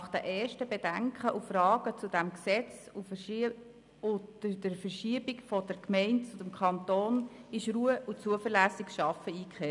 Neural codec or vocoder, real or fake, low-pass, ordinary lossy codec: none; real; 10.8 kHz; none